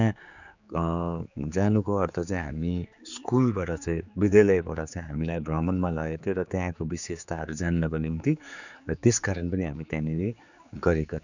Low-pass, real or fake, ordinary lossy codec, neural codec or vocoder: 7.2 kHz; fake; none; codec, 16 kHz, 4 kbps, X-Codec, HuBERT features, trained on general audio